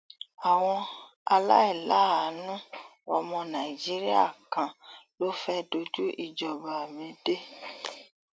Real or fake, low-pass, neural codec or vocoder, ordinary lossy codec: real; none; none; none